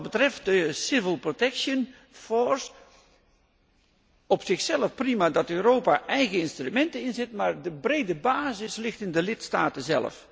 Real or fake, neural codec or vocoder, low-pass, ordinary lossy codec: real; none; none; none